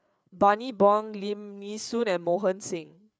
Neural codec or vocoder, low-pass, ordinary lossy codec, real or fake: codec, 16 kHz, 8 kbps, FreqCodec, larger model; none; none; fake